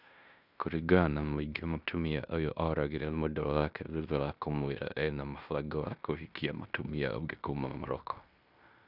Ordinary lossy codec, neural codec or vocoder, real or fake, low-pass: none; codec, 16 kHz in and 24 kHz out, 0.9 kbps, LongCat-Audio-Codec, fine tuned four codebook decoder; fake; 5.4 kHz